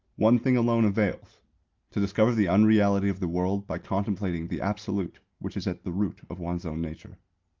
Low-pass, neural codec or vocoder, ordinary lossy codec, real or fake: 7.2 kHz; none; Opus, 32 kbps; real